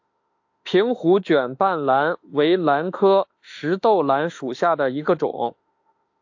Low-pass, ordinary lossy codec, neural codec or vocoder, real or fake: 7.2 kHz; AAC, 48 kbps; autoencoder, 48 kHz, 32 numbers a frame, DAC-VAE, trained on Japanese speech; fake